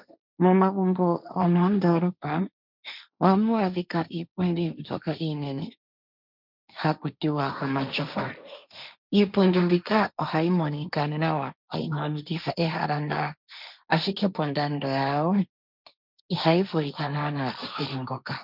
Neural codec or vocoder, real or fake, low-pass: codec, 16 kHz, 1.1 kbps, Voila-Tokenizer; fake; 5.4 kHz